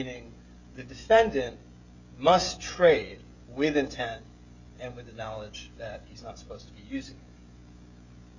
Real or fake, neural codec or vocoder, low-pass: fake; codec, 16 kHz, 16 kbps, FreqCodec, smaller model; 7.2 kHz